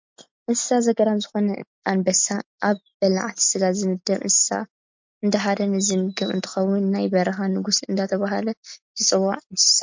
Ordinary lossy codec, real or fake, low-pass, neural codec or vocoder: MP3, 48 kbps; real; 7.2 kHz; none